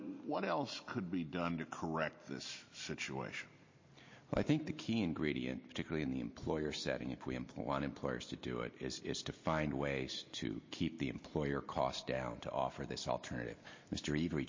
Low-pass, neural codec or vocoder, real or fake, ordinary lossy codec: 7.2 kHz; none; real; MP3, 32 kbps